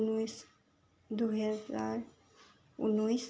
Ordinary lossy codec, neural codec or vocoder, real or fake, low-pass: none; none; real; none